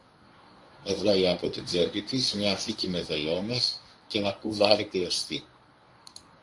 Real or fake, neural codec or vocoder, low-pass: fake; codec, 24 kHz, 0.9 kbps, WavTokenizer, medium speech release version 1; 10.8 kHz